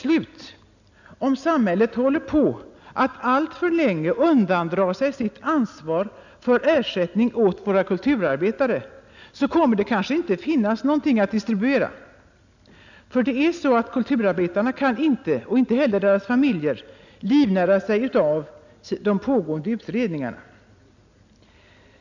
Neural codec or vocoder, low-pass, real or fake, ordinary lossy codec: none; 7.2 kHz; real; none